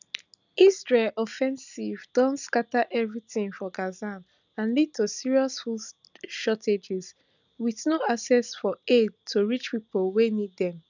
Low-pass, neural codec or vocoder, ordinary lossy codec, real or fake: 7.2 kHz; none; none; real